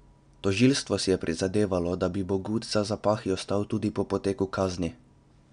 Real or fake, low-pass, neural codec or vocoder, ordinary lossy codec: real; 9.9 kHz; none; none